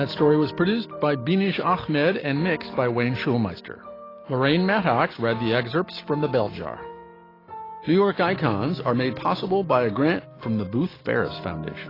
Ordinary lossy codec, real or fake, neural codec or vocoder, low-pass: AAC, 24 kbps; real; none; 5.4 kHz